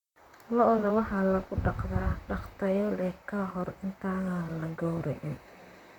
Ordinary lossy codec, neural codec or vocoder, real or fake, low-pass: none; vocoder, 44.1 kHz, 128 mel bands, Pupu-Vocoder; fake; 19.8 kHz